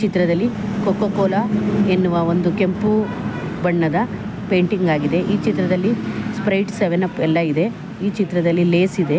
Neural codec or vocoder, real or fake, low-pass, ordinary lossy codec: none; real; none; none